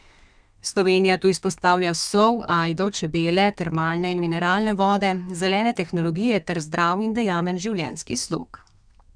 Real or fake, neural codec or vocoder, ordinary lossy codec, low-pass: fake; codec, 32 kHz, 1.9 kbps, SNAC; none; 9.9 kHz